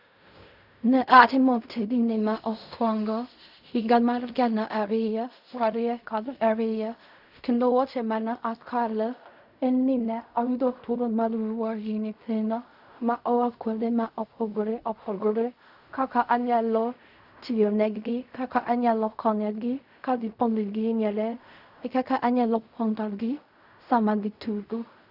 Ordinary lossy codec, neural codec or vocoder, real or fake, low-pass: none; codec, 16 kHz in and 24 kHz out, 0.4 kbps, LongCat-Audio-Codec, fine tuned four codebook decoder; fake; 5.4 kHz